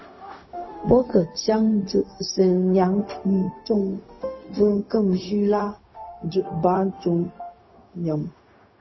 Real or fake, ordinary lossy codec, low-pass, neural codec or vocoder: fake; MP3, 24 kbps; 7.2 kHz; codec, 16 kHz, 0.4 kbps, LongCat-Audio-Codec